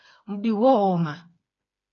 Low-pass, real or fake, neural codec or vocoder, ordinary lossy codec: 7.2 kHz; fake; codec, 16 kHz, 4 kbps, FreqCodec, smaller model; AAC, 32 kbps